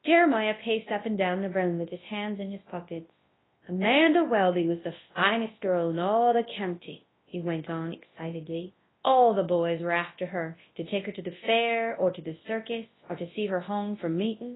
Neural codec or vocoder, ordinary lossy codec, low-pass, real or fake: codec, 24 kHz, 0.9 kbps, WavTokenizer, large speech release; AAC, 16 kbps; 7.2 kHz; fake